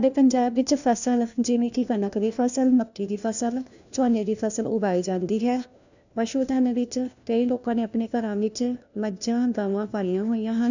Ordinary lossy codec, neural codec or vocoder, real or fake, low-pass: none; codec, 16 kHz, 1 kbps, FunCodec, trained on LibriTTS, 50 frames a second; fake; 7.2 kHz